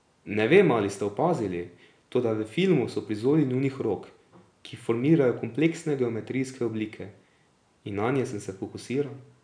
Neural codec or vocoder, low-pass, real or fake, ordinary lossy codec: none; 9.9 kHz; real; none